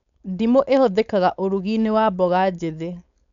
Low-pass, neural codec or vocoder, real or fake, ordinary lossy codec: 7.2 kHz; codec, 16 kHz, 4.8 kbps, FACodec; fake; none